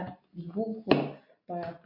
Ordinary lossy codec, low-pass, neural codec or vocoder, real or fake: MP3, 32 kbps; 5.4 kHz; none; real